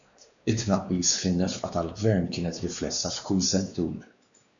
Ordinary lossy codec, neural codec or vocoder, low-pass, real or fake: MP3, 96 kbps; codec, 16 kHz, 2 kbps, X-Codec, WavLM features, trained on Multilingual LibriSpeech; 7.2 kHz; fake